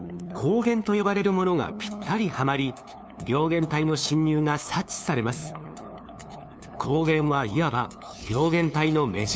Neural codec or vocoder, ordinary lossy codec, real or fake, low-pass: codec, 16 kHz, 2 kbps, FunCodec, trained on LibriTTS, 25 frames a second; none; fake; none